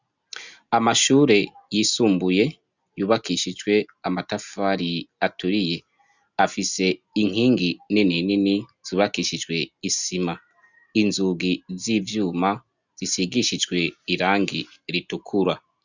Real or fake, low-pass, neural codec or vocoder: real; 7.2 kHz; none